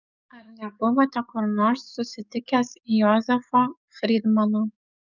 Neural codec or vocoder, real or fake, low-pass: codec, 44.1 kHz, 7.8 kbps, DAC; fake; 7.2 kHz